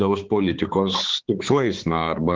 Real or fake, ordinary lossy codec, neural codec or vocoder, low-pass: fake; Opus, 16 kbps; codec, 16 kHz, 4 kbps, X-Codec, HuBERT features, trained on balanced general audio; 7.2 kHz